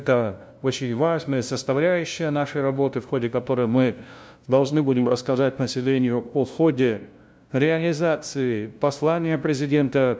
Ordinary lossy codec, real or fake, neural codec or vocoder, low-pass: none; fake; codec, 16 kHz, 0.5 kbps, FunCodec, trained on LibriTTS, 25 frames a second; none